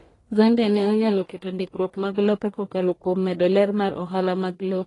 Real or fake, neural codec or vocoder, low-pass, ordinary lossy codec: fake; codec, 44.1 kHz, 1.7 kbps, Pupu-Codec; 10.8 kHz; AAC, 32 kbps